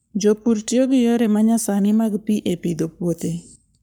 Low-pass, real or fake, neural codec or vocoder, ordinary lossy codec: none; fake; codec, 44.1 kHz, 7.8 kbps, Pupu-Codec; none